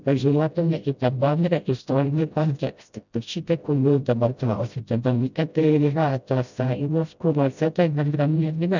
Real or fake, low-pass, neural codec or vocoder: fake; 7.2 kHz; codec, 16 kHz, 0.5 kbps, FreqCodec, smaller model